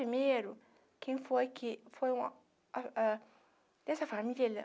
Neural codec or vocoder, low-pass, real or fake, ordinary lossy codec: none; none; real; none